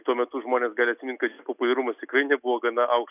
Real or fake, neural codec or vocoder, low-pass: real; none; 3.6 kHz